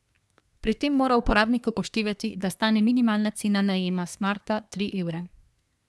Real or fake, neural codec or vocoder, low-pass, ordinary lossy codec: fake; codec, 24 kHz, 1 kbps, SNAC; none; none